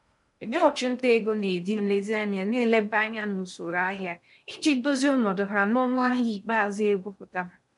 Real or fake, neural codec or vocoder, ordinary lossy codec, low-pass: fake; codec, 16 kHz in and 24 kHz out, 0.6 kbps, FocalCodec, streaming, 2048 codes; MP3, 96 kbps; 10.8 kHz